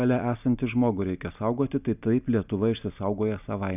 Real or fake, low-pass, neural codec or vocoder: real; 3.6 kHz; none